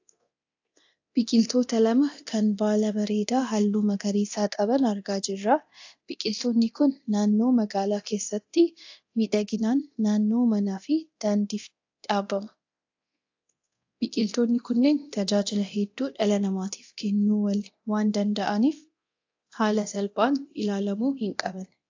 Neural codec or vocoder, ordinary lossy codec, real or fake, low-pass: codec, 24 kHz, 0.9 kbps, DualCodec; AAC, 48 kbps; fake; 7.2 kHz